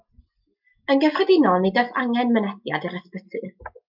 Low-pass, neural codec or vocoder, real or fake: 5.4 kHz; none; real